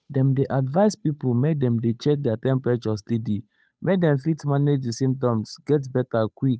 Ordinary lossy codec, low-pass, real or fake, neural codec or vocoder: none; none; fake; codec, 16 kHz, 8 kbps, FunCodec, trained on Chinese and English, 25 frames a second